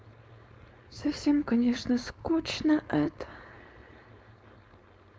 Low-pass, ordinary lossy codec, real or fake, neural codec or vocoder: none; none; fake; codec, 16 kHz, 4.8 kbps, FACodec